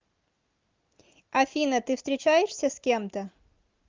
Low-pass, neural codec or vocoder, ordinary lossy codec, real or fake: 7.2 kHz; none; Opus, 32 kbps; real